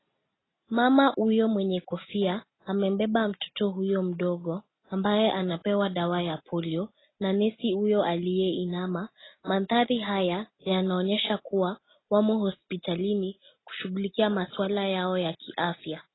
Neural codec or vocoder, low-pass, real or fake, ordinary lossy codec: none; 7.2 kHz; real; AAC, 16 kbps